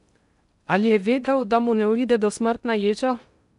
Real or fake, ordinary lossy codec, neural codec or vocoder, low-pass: fake; none; codec, 16 kHz in and 24 kHz out, 0.6 kbps, FocalCodec, streaming, 2048 codes; 10.8 kHz